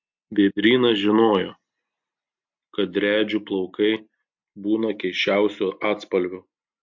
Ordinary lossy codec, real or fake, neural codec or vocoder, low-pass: MP3, 48 kbps; real; none; 7.2 kHz